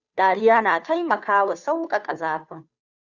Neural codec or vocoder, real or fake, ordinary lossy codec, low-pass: codec, 16 kHz, 2 kbps, FunCodec, trained on Chinese and English, 25 frames a second; fake; Opus, 64 kbps; 7.2 kHz